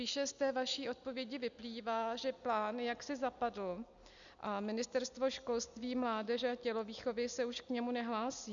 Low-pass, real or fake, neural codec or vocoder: 7.2 kHz; real; none